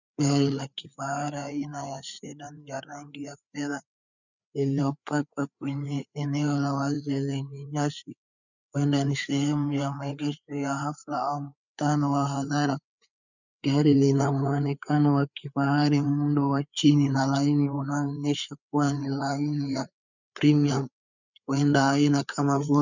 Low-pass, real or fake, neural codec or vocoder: 7.2 kHz; fake; codec, 16 kHz, 4 kbps, FreqCodec, larger model